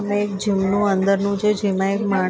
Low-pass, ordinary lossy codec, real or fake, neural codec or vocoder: none; none; real; none